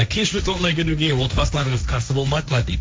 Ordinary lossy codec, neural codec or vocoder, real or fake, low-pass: none; codec, 16 kHz, 1.1 kbps, Voila-Tokenizer; fake; none